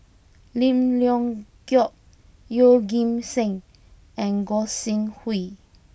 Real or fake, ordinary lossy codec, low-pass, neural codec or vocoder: real; none; none; none